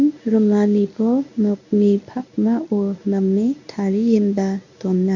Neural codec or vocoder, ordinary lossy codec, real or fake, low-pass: codec, 24 kHz, 0.9 kbps, WavTokenizer, medium speech release version 1; none; fake; 7.2 kHz